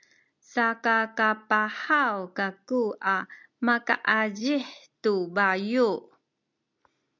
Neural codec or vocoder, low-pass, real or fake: none; 7.2 kHz; real